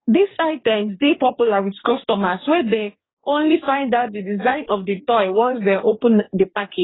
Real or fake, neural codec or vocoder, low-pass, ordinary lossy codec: fake; codec, 44.1 kHz, 2.6 kbps, DAC; 7.2 kHz; AAC, 16 kbps